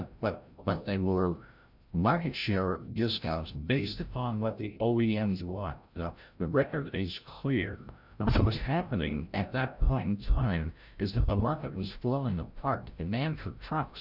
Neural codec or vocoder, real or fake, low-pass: codec, 16 kHz, 0.5 kbps, FreqCodec, larger model; fake; 5.4 kHz